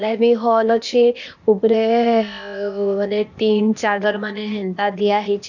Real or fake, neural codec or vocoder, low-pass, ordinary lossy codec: fake; codec, 16 kHz, 0.8 kbps, ZipCodec; 7.2 kHz; none